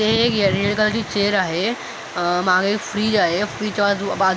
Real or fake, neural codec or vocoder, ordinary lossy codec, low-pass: real; none; none; none